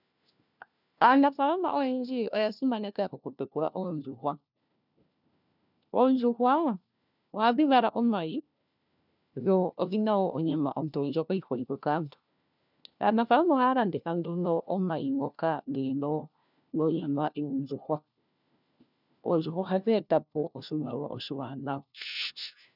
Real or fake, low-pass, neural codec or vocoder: fake; 5.4 kHz; codec, 16 kHz, 1 kbps, FunCodec, trained on LibriTTS, 50 frames a second